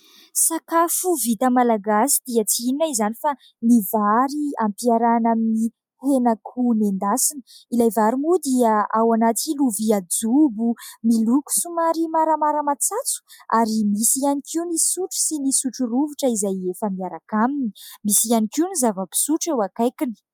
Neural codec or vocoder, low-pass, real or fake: none; 19.8 kHz; real